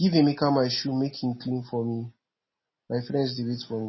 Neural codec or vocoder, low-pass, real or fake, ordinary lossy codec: none; 7.2 kHz; real; MP3, 24 kbps